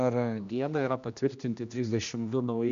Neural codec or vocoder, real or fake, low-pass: codec, 16 kHz, 1 kbps, X-Codec, HuBERT features, trained on general audio; fake; 7.2 kHz